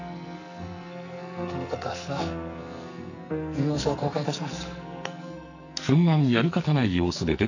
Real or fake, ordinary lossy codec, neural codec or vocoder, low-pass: fake; none; codec, 44.1 kHz, 2.6 kbps, SNAC; 7.2 kHz